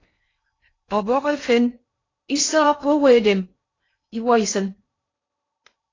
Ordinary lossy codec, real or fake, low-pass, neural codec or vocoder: AAC, 32 kbps; fake; 7.2 kHz; codec, 16 kHz in and 24 kHz out, 0.6 kbps, FocalCodec, streaming, 2048 codes